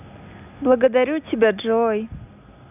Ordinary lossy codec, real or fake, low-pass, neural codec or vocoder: none; real; 3.6 kHz; none